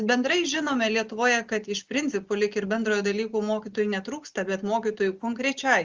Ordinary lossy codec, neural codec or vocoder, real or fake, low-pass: Opus, 32 kbps; none; real; 7.2 kHz